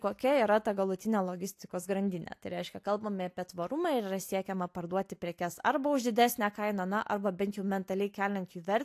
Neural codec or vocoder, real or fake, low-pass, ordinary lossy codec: none; real; 14.4 kHz; AAC, 64 kbps